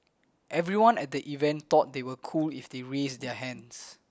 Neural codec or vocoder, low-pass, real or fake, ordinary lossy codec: none; none; real; none